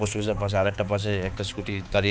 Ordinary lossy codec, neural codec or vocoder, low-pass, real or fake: none; codec, 16 kHz, 4 kbps, X-Codec, HuBERT features, trained on balanced general audio; none; fake